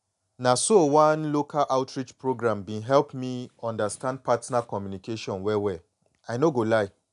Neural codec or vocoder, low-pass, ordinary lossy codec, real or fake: none; 10.8 kHz; none; real